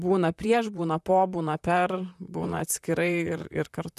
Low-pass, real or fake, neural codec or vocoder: 14.4 kHz; fake; vocoder, 44.1 kHz, 128 mel bands, Pupu-Vocoder